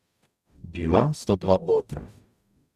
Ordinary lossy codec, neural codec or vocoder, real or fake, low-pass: none; codec, 44.1 kHz, 0.9 kbps, DAC; fake; 14.4 kHz